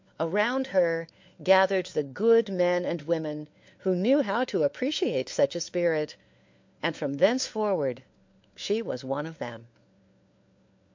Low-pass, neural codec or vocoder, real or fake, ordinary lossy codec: 7.2 kHz; codec, 16 kHz, 4 kbps, FunCodec, trained on LibriTTS, 50 frames a second; fake; MP3, 48 kbps